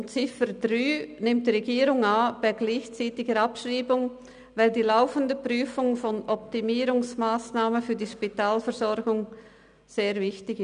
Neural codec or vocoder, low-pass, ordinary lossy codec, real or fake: none; 9.9 kHz; none; real